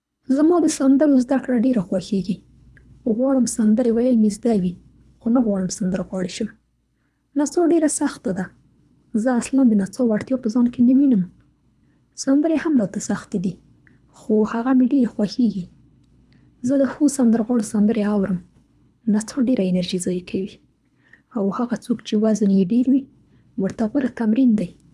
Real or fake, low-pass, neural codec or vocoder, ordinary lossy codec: fake; none; codec, 24 kHz, 3 kbps, HILCodec; none